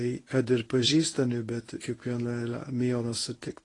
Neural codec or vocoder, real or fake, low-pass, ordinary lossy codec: codec, 24 kHz, 0.9 kbps, WavTokenizer, medium speech release version 1; fake; 10.8 kHz; AAC, 32 kbps